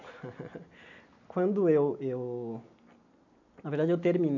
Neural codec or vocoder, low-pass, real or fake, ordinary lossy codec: none; 7.2 kHz; real; none